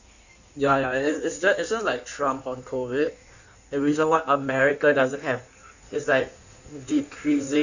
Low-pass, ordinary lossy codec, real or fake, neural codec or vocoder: 7.2 kHz; none; fake; codec, 16 kHz in and 24 kHz out, 1.1 kbps, FireRedTTS-2 codec